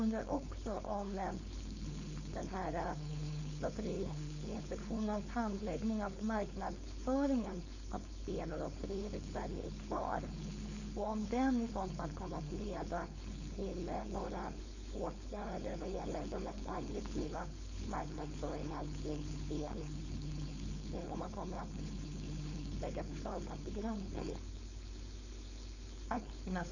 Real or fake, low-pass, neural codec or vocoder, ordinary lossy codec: fake; 7.2 kHz; codec, 16 kHz, 4.8 kbps, FACodec; none